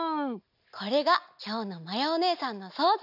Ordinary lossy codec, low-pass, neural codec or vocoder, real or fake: AAC, 48 kbps; 5.4 kHz; none; real